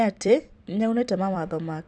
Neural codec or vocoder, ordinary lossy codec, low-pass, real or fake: vocoder, 44.1 kHz, 128 mel bands every 256 samples, BigVGAN v2; Opus, 64 kbps; 9.9 kHz; fake